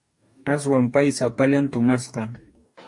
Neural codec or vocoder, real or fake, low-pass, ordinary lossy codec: codec, 44.1 kHz, 2.6 kbps, DAC; fake; 10.8 kHz; AAC, 64 kbps